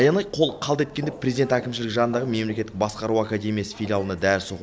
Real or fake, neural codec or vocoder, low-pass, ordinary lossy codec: real; none; none; none